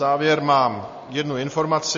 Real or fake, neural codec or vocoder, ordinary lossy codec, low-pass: real; none; MP3, 32 kbps; 7.2 kHz